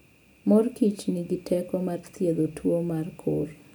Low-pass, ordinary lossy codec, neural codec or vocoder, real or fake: none; none; none; real